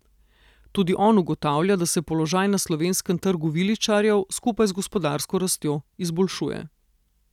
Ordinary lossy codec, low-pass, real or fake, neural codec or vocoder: none; 19.8 kHz; real; none